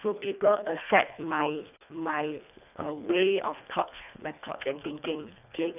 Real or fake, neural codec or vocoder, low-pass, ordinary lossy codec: fake; codec, 24 kHz, 1.5 kbps, HILCodec; 3.6 kHz; none